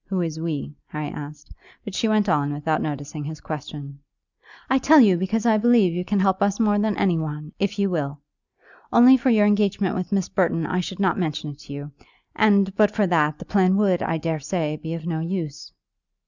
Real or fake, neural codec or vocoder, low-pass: real; none; 7.2 kHz